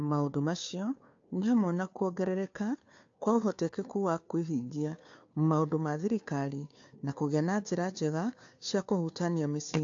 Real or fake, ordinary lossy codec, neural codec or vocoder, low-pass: fake; AAC, 48 kbps; codec, 16 kHz, 2 kbps, FunCodec, trained on Chinese and English, 25 frames a second; 7.2 kHz